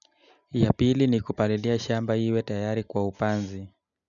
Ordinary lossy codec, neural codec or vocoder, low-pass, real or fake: none; none; 7.2 kHz; real